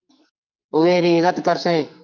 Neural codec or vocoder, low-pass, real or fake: codec, 44.1 kHz, 2.6 kbps, SNAC; 7.2 kHz; fake